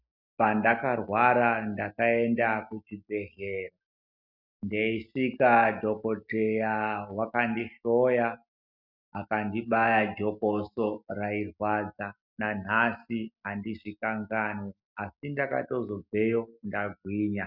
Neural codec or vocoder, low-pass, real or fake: none; 5.4 kHz; real